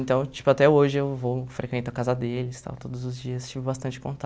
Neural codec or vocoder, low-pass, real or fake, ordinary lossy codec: none; none; real; none